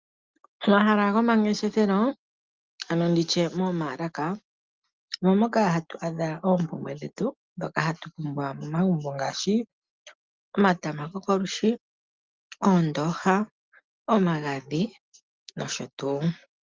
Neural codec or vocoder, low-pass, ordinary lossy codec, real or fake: none; 7.2 kHz; Opus, 32 kbps; real